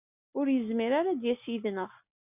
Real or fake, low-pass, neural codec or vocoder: real; 3.6 kHz; none